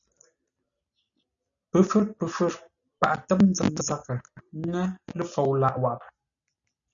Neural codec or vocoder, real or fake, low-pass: none; real; 7.2 kHz